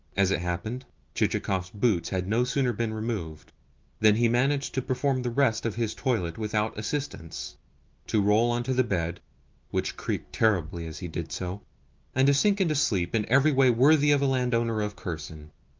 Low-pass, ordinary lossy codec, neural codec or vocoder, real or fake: 7.2 kHz; Opus, 24 kbps; none; real